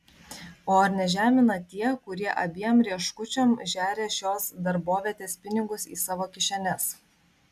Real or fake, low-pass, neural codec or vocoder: real; 14.4 kHz; none